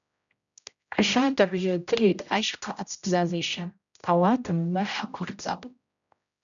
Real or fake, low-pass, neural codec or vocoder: fake; 7.2 kHz; codec, 16 kHz, 0.5 kbps, X-Codec, HuBERT features, trained on general audio